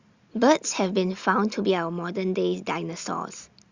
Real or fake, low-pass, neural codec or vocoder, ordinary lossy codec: real; 7.2 kHz; none; Opus, 64 kbps